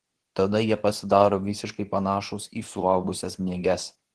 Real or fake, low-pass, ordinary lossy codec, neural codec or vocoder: fake; 10.8 kHz; Opus, 16 kbps; codec, 24 kHz, 0.9 kbps, WavTokenizer, medium speech release version 2